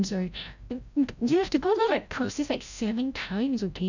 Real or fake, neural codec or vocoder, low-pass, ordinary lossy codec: fake; codec, 16 kHz, 0.5 kbps, FreqCodec, larger model; 7.2 kHz; none